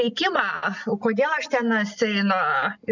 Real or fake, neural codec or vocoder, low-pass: real; none; 7.2 kHz